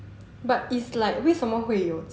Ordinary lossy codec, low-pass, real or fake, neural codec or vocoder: none; none; real; none